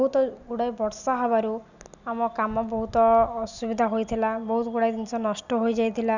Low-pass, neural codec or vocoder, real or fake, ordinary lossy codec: 7.2 kHz; none; real; none